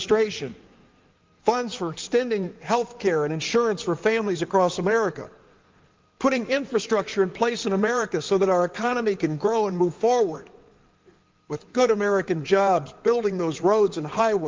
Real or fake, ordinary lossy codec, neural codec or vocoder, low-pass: fake; Opus, 24 kbps; codec, 16 kHz in and 24 kHz out, 2.2 kbps, FireRedTTS-2 codec; 7.2 kHz